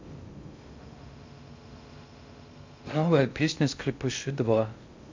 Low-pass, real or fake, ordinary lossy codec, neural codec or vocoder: 7.2 kHz; fake; MP3, 48 kbps; codec, 16 kHz in and 24 kHz out, 0.6 kbps, FocalCodec, streaming, 2048 codes